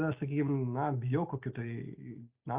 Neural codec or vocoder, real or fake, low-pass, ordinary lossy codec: none; real; 3.6 kHz; Opus, 64 kbps